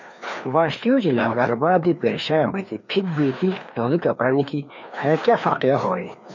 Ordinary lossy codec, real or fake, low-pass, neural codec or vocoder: MP3, 64 kbps; fake; 7.2 kHz; codec, 16 kHz, 2 kbps, FreqCodec, larger model